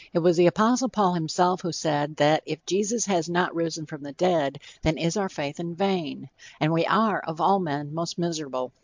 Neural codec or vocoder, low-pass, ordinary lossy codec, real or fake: none; 7.2 kHz; MP3, 64 kbps; real